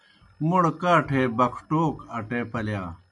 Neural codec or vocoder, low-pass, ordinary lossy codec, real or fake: none; 10.8 kHz; MP3, 96 kbps; real